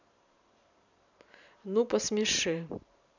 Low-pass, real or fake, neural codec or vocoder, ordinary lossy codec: 7.2 kHz; fake; vocoder, 44.1 kHz, 128 mel bands every 512 samples, BigVGAN v2; none